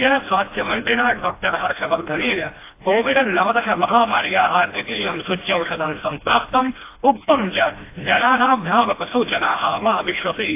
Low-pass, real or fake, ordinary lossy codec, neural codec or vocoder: 3.6 kHz; fake; AAC, 24 kbps; codec, 16 kHz, 1 kbps, FreqCodec, smaller model